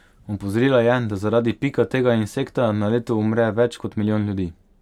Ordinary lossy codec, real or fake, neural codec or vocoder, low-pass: none; real; none; 19.8 kHz